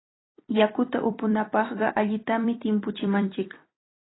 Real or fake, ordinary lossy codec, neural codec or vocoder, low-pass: fake; AAC, 16 kbps; vocoder, 44.1 kHz, 128 mel bands every 256 samples, BigVGAN v2; 7.2 kHz